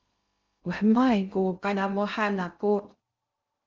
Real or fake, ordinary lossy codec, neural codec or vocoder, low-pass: fake; Opus, 32 kbps; codec, 16 kHz in and 24 kHz out, 0.6 kbps, FocalCodec, streaming, 2048 codes; 7.2 kHz